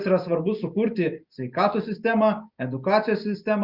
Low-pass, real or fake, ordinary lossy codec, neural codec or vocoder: 5.4 kHz; real; Opus, 64 kbps; none